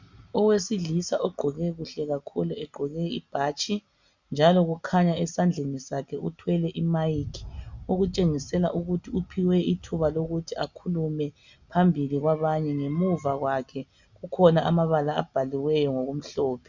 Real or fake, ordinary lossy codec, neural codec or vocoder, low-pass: real; Opus, 64 kbps; none; 7.2 kHz